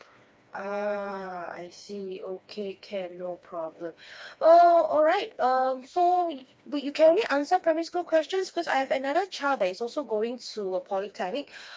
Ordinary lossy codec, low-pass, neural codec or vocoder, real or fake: none; none; codec, 16 kHz, 2 kbps, FreqCodec, smaller model; fake